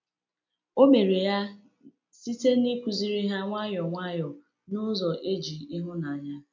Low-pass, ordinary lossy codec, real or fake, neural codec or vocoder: 7.2 kHz; MP3, 64 kbps; real; none